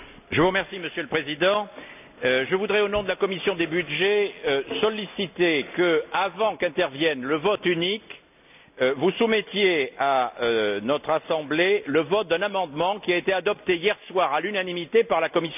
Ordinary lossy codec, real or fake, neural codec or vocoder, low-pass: none; real; none; 3.6 kHz